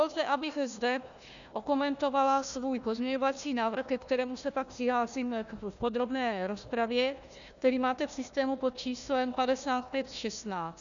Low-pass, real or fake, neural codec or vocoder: 7.2 kHz; fake; codec, 16 kHz, 1 kbps, FunCodec, trained on Chinese and English, 50 frames a second